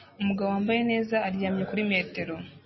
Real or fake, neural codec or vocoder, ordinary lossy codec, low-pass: real; none; MP3, 24 kbps; 7.2 kHz